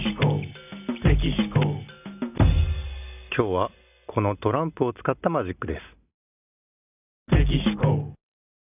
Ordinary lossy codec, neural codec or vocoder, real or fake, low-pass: none; none; real; 3.6 kHz